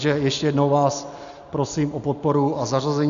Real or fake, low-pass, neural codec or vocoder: real; 7.2 kHz; none